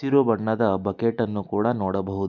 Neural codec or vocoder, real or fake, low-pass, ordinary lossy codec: none; real; 7.2 kHz; none